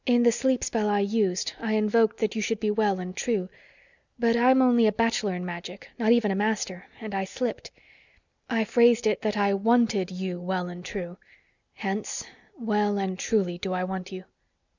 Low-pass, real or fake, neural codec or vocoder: 7.2 kHz; real; none